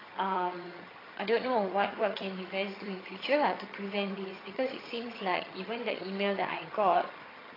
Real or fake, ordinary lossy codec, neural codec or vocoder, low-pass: fake; AAC, 24 kbps; vocoder, 22.05 kHz, 80 mel bands, HiFi-GAN; 5.4 kHz